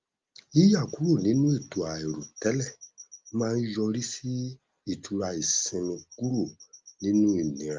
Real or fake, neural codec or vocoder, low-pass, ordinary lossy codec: real; none; 7.2 kHz; Opus, 32 kbps